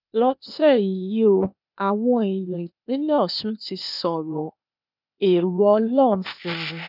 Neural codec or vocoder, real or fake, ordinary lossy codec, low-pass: codec, 16 kHz, 0.8 kbps, ZipCodec; fake; none; 5.4 kHz